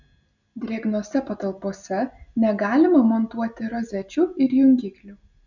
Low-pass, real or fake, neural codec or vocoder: 7.2 kHz; real; none